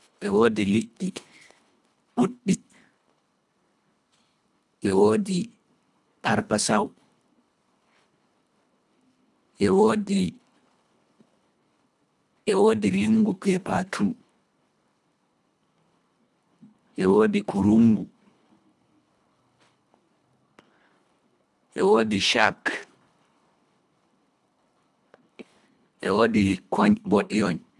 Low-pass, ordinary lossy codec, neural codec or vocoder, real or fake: none; none; codec, 24 kHz, 1.5 kbps, HILCodec; fake